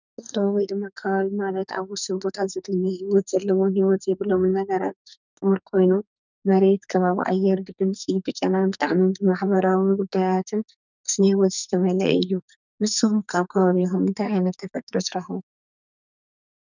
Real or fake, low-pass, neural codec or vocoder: fake; 7.2 kHz; codec, 44.1 kHz, 2.6 kbps, SNAC